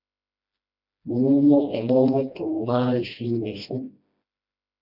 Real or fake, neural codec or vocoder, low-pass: fake; codec, 16 kHz, 1 kbps, FreqCodec, smaller model; 5.4 kHz